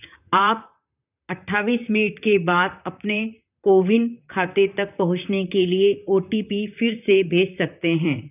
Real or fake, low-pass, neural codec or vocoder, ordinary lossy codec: fake; 3.6 kHz; vocoder, 44.1 kHz, 128 mel bands, Pupu-Vocoder; none